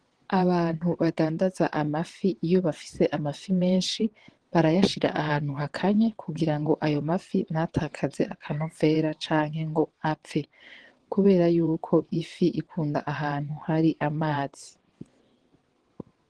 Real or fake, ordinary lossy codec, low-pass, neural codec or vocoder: fake; Opus, 16 kbps; 9.9 kHz; vocoder, 22.05 kHz, 80 mel bands, WaveNeXt